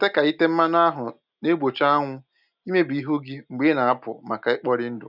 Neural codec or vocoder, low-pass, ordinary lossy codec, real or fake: none; 5.4 kHz; none; real